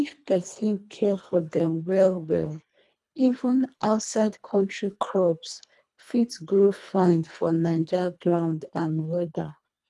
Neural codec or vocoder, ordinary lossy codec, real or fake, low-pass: codec, 24 kHz, 1.5 kbps, HILCodec; none; fake; none